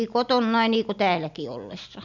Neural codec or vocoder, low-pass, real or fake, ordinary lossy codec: none; 7.2 kHz; real; none